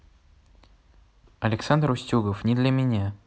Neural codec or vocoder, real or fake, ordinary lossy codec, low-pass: none; real; none; none